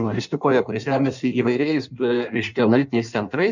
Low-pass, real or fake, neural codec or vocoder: 7.2 kHz; fake; codec, 16 kHz in and 24 kHz out, 1.1 kbps, FireRedTTS-2 codec